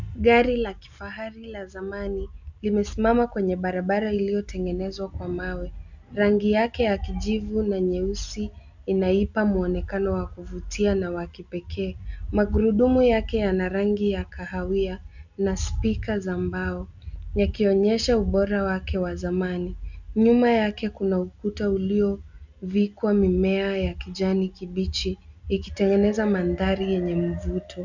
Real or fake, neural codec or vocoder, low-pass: real; none; 7.2 kHz